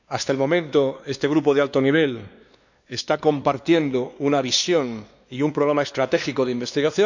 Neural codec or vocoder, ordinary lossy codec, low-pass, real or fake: codec, 16 kHz, 2 kbps, X-Codec, WavLM features, trained on Multilingual LibriSpeech; none; 7.2 kHz; fake